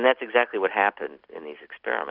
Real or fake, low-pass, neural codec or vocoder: real; 5.4 kHz; none